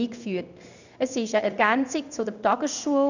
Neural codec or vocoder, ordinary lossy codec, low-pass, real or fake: codec, 16 kHz in and 24 kHz out, 1 kbps, XY-Tokenizer; none; 7.2 kHz; fake